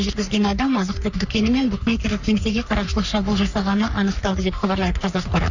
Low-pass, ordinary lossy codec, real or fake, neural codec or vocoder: 7.2 kHz; none; fake; codec, 44.1 kHz, 3.4 kbps, Pupu-Codec